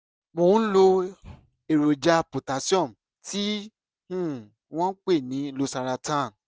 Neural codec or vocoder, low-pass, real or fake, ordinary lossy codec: none; none; real; none